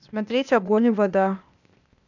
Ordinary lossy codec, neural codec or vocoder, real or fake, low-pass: none; codec, 16 kHz, 0.8 kbps, ZipCodec; fake; 7.2 kHz